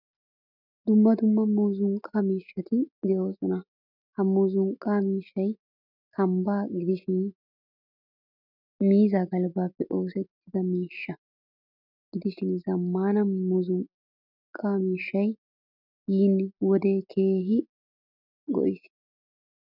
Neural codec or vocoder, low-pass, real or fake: none; 5.4 kHz; real